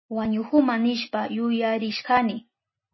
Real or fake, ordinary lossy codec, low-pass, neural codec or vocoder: real; MP3, 24 kbps; 7.2 kHz; none